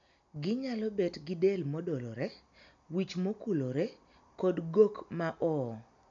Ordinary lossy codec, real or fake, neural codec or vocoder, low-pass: MP3, 64 kbps; real; none; 7.2 kHz